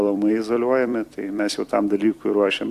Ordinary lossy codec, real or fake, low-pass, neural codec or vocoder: Opus, 64 kbps; fake; 14.4 kHz; vocoder, 44.1 kHz, 128 mel bands every 256 samples, BigVGAN v2